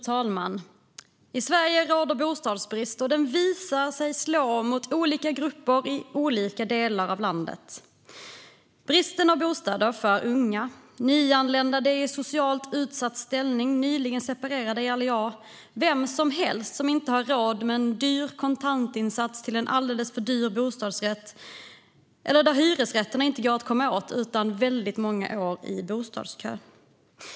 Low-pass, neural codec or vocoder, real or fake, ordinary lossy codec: none; none; real; none